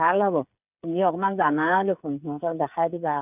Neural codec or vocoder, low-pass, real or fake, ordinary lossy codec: codec, 16 kHz, 8 kbps, FreqCodec, smaller model; 3.6 kHz; fake; none